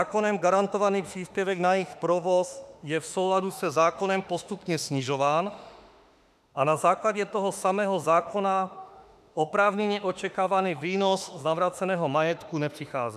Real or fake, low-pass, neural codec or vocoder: fake; 14.4 kHz; autoencoder, 48 kHz, 32 numbers a frame, DAC-VAE, trained on Japanese speech